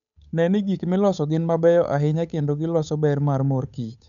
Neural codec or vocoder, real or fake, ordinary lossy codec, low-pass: codec, 16 kHz, 8 kbps, FunCodec, trained on Chinese and English, 25 frames a second; fake; none; 7.2 kHz